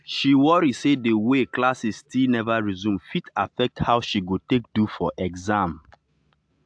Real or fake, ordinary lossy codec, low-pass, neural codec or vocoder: real; AAC, 64 kbps; 9.9 kHz; none